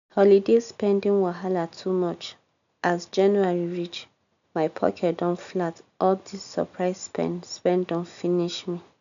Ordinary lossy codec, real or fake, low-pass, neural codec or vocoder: none; real; 7.2 kHz; none